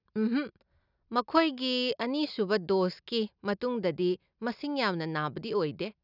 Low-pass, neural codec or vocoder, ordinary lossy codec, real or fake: 5.4 kHz; none; none; real